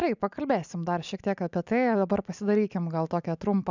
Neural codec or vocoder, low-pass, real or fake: none; 7.2 kHz; real